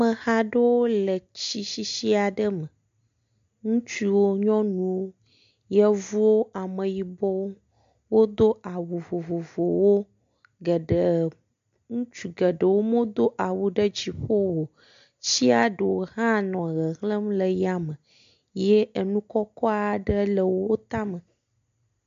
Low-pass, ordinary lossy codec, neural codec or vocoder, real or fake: 7.2 kHz; MP3, 48 kbps; none; real